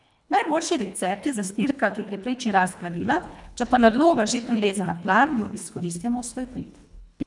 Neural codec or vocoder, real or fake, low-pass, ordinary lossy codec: codec, 24 kHz, 1.5 kbps, HILCodec; fake; 10.8 kHz; none